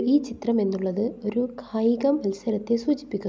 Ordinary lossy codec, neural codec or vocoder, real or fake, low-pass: none; none; real; none